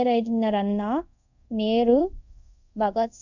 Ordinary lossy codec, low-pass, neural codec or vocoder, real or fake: none; 7.2 kHz; codec, 24 kHz, 0.5 kbps, DualCodec; fake